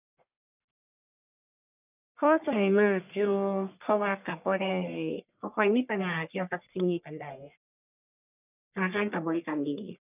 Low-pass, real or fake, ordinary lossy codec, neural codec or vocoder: 3.6 kHz; fake; none; codec, 44.1 kHz, 1.7 kbps, Pupu-Codec